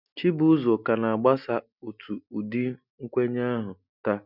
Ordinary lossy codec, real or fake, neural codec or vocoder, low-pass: none; real; none; 5.4 kHz